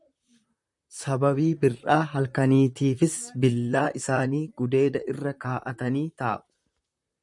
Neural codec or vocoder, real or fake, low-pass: vocoder, 44.1 kHz, 128 mel bands, Pupu-Vocoder; fake; 10.8 kHz